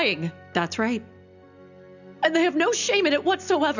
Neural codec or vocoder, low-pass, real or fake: none; 7.2 kHz; real